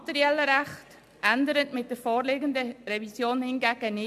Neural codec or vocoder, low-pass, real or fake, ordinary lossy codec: none; 14.4 kHz; real; MP3, 64 kbps